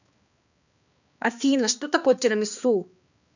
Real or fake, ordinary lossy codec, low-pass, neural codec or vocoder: fake; none; 7.2 kHz; codec, 16 kHz, 2 kbps, X-Codec, HuBERT features, trained on balanced general audio